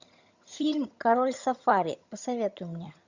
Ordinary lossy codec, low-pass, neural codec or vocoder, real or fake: Opus, 64 kbps; 7.2 kHz; vocoder, 22.05 kHz, 80 mel bands, HiFi-GAN; fake